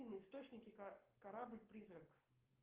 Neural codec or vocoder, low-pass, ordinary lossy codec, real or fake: codec, 44.1 kHz, 7.8 kbps, Pupu-Codec; 3.6 kHz; Opus, 16 kbps; fake